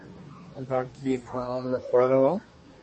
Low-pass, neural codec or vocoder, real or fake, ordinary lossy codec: 10.8 kHz; codec, 24 kHz, 1 kbps, SNAC; fake; MP3, 32 kbps